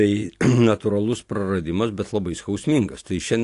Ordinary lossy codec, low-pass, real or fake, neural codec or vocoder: AAC, 48 kbps; 10.8 kHz; real; none